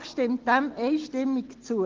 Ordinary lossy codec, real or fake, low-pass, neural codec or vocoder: Opus, 16 kbps; real; 7.2 kHz; none